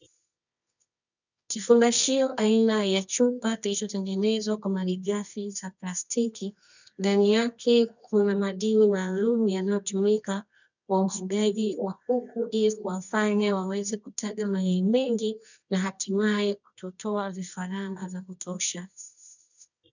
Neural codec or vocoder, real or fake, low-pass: codec, 24 kHz, 0.9 kbps, WavTokenizer, medium music audio release; fake; 7.2 kHz